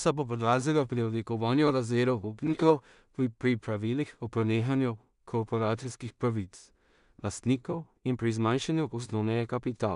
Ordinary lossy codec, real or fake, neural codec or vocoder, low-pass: none; fake; codec, 16 kHz in and 24 kHz out, 0.4 kbps, LongCat-Audio-Codec, two codebook decoder; 10.8 kHz